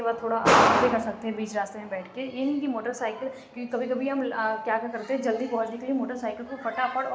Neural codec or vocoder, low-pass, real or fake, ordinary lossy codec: none; none; real; none